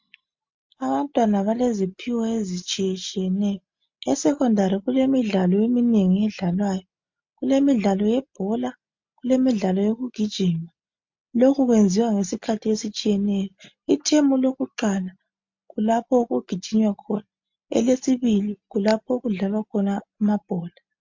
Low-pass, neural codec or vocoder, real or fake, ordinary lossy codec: 7.2 kHz; none; real; MP3, 48 kbps